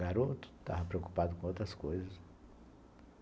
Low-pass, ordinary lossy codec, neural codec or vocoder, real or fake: none; none; none; real